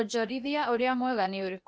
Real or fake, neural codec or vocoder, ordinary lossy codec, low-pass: fake; codec, 16 kHz, 0.8 kbps, ZipCodec; none; none